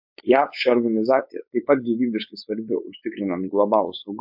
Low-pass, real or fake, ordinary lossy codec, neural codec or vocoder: 5.4 kHz; fake; MP3, 48 kbps; codec, 16 kHz, 4.8 kbps, FACodec